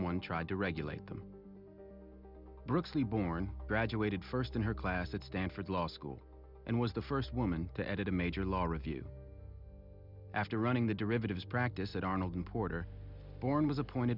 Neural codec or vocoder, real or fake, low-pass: none; real; 5.4 kHz